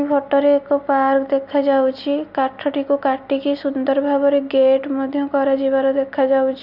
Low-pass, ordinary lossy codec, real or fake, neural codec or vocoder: 5.4 kHz; Opus, 64 kbps; real; none